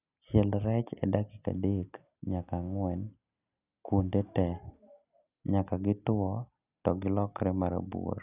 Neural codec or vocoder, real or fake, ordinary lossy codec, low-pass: none; real; none; 3.6 kHz